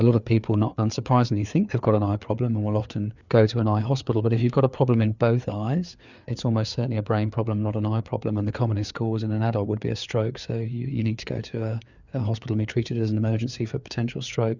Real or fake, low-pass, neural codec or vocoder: fake; 7.2 kHz; codec, 16 kHz, 4 kbps, FreqCodec, larger model